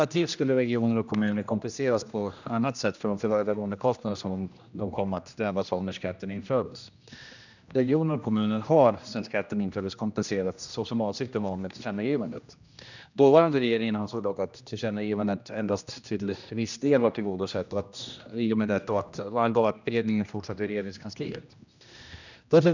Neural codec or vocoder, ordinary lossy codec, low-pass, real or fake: codec, 16 kHz, 1 kbps, X-Codec, HuBERT features, trained on general audio; none; 7.2 kHz; fake